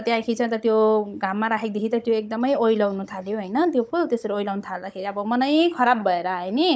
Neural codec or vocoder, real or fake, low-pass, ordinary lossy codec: codec, 16 kHz, 16 kbps, FunCodec, trained on Chinese and English, 50 frames a second; fake; none; none